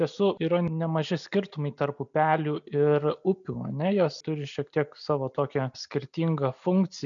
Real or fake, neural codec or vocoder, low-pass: real; none; 7.2 kHz